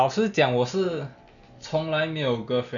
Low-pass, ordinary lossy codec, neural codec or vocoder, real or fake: 7.2 kHz; none; none; real